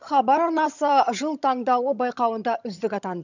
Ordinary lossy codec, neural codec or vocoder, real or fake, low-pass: none; vocoder, 22.05 kHz, 80 mel bands, HiFi-GAN; fake; 7.2 kHz